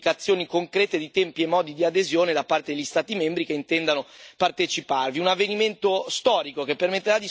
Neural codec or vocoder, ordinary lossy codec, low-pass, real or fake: none; none; none; real